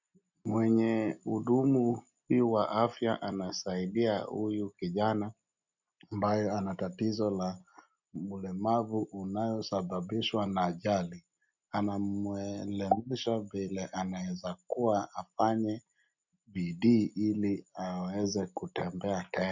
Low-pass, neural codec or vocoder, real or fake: 7.2 kHz; none; real